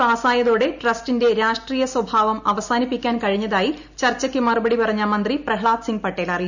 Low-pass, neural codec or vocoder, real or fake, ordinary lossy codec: 7.2 kHz; none; real; none